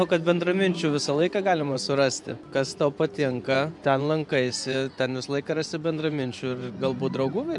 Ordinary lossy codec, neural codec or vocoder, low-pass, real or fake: MP3, 96 kbps; vocoder, 24 kHz, 100 mel bands, Vocos; 10.8 kHz; fake